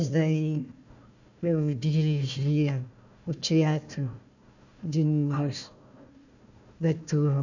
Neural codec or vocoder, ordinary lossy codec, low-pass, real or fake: codec, 16 kHz, 1 kbps, FunCodec, trained on Chinese and English, 50 frames a second; none; 7.2 kHz; fake